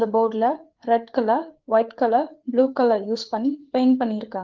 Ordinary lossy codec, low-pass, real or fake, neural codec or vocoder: Opus, 16 kbps; 7.2 kHz; fake; codec, 16 kHz, 4 kbps, FreqCodec, larger model